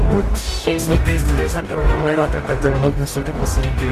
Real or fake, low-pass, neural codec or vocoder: fake; 14.4 kHz; codec, 44.1 kHz, 0.9 kbps, DAC